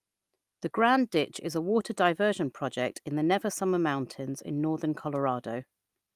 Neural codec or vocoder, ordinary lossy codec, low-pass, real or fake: none; Opus, 32 kbps; 14.4 kHz; real